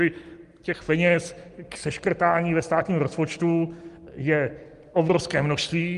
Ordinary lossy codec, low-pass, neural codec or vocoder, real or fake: Opus, 24 kbps; 10.8 kHz; none; real